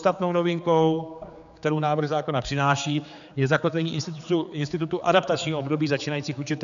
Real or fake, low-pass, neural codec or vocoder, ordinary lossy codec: fake; 7.2 kHz; codec, 16 kHz, 4 kbps, X-Codec, HuBERT features, trained on general audio; MP3, 96 kbps